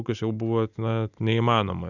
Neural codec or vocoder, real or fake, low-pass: none; real; 7.2 kHz